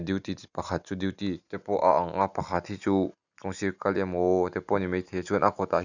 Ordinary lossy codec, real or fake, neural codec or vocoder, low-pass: none; real; none; 7.2 kHz